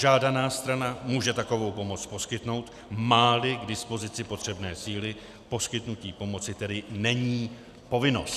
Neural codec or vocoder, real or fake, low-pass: none; real; 14.4 kHz